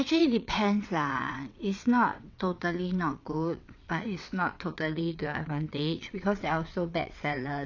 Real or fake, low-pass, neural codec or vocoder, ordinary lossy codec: fake; 7.2 kHz; vocoder, 22.05 kHz, 80 mel bands, WaveNeXt; none